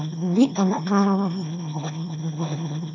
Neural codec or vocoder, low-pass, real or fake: autoencoder, 22.05 kHz, a latent of 192 numbers a frame, VITS, trained on one speaker; 7.2 kHz; fake